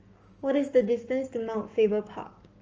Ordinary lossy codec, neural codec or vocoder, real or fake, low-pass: Opus, 24 kbps; codec, 44.1 kHz, 7.8 kbps, Pupu-Codec; fake; 7.2 kHz